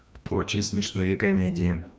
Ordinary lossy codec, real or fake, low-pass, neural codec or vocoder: none; fake; none; codec, 16 kHz, 1 kbps, FreqCodec, larger model